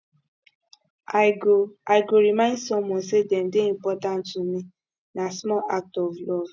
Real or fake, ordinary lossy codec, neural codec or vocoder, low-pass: real; none; none; none